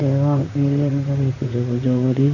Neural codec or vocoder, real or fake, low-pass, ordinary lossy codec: vocoder, 44.1 kHz, 128 mel bands, Pupu-Vocoder; fake; 7.2 kHz; none